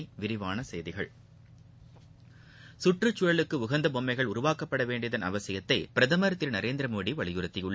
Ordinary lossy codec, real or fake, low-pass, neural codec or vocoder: none; real; none; none